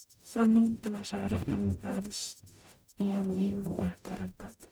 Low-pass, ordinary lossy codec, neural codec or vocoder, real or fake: none; none; codec, 44.1 kHz, 0.9 kbps, DAC; fake